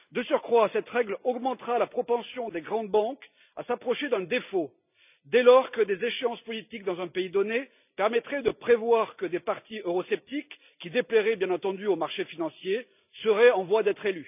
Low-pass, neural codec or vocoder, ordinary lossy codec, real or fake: 3.6 kHz; none; none; real